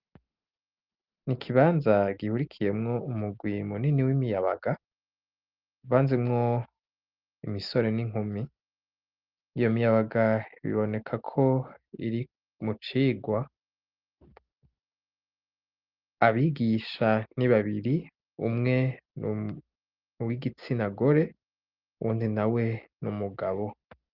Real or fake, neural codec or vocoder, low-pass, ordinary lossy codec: real; none; 5.4 kHz; Opus, 24 kbps